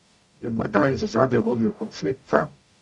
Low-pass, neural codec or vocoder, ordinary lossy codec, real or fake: 10.8 kHz; codec, 44.1 kHz, 0.9 kbps, DAC; MP3, 96 kbps; fake